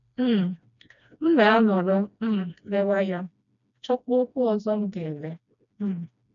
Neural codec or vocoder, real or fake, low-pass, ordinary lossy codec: codec, 16 kHz, 1 kbps, FreqCodec, smaller model; fake; 7.2 kHz; none